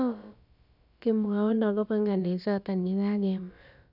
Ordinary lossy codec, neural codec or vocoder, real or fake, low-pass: none; codec, 16 kHz, about 1 kbps, DyCAST, with the encoder's durations; fake; 5.4 kHz